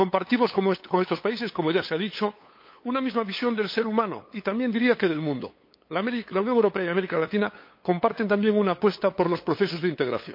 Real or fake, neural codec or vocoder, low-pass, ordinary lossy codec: fake; codec, 16 kHz, 8 kbps, FunCodec, trained on LibriTTS, 25 frames a second; 5.4 kHz; MP3, 32 kbps